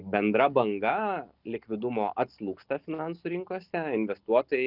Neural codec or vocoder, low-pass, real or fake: none; 5.4 kHz; real